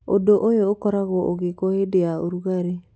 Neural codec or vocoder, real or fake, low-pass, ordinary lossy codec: none; real; none; none